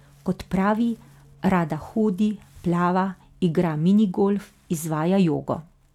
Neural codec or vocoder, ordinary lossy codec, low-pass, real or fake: none; none; 19.8 kHz; real